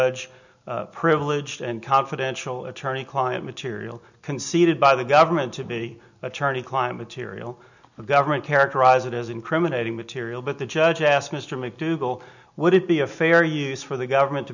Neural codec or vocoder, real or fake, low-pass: none; real; 7.2 kHz